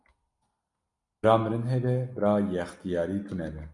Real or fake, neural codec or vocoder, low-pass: real; none; 10.8 kHz